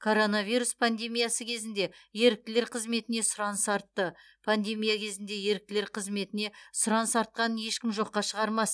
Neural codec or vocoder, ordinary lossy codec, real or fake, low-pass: none; none; real; none